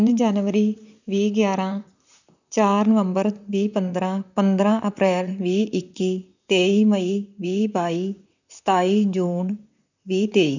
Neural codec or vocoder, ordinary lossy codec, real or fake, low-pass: vocoder, 44.1 kHz, 128 mel bands, Pupu-Vocoder; none; fake; 7.2 kHz